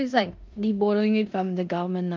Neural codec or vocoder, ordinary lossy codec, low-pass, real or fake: codec, 16 kHz in and 24 kHz out, 0.9 kbps, LongCat-Audio-Codec, four codebook decoder; Opus, 32 kbps; 7.2 kHz; fake